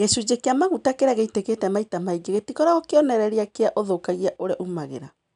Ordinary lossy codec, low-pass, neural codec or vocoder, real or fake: none; 9.9 kHz; none; real